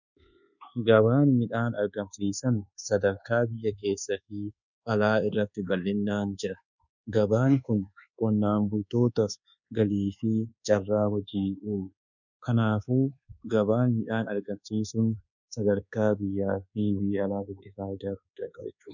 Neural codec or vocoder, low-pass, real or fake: codec, 24 kHz, 1.2 kbps, DualCodec; 7.2 kHz; fake